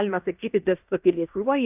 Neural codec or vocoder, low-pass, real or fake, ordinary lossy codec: codec, 16 kHz in and 24 kHz out, 0.9 kbps, LongCat-Audio-Codec, fine tuned four codebook decoder; 3.6 kHz; fake; AAC, 32 kbps